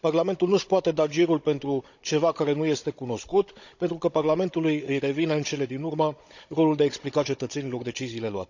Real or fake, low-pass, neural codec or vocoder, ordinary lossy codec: fake; 7.2 kHz; codec, 16 kHz, 16 kbps, FunCodec, trained on Chinese and English, 50 frames a second; none